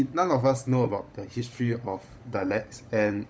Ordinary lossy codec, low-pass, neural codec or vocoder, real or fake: none; none; codec, 16 kHz, 16 kbps, FunCodec, trained on LibriTTS, 50 frames a second; fake